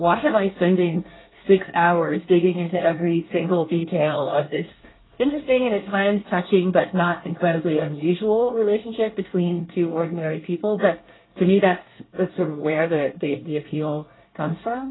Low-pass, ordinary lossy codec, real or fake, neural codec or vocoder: 7.2 kHz; AAC, 16 kbps; fake; codec, 24 kHz, 1 kbps, SNAC